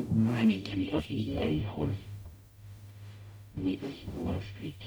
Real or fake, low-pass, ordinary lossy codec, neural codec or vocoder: fake; none; none; codec, 44.1 kHz, 0.9 kbps, DAC